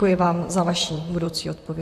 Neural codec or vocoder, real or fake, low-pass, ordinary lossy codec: vocoder, 44.1 kHz, 128 mel bands, Pupu-Vocoder; fake; 14.4 kHz; MP3, 64 kbps